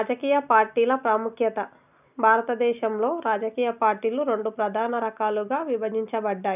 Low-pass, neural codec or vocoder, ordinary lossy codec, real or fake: 3.6 kHz; none; none; real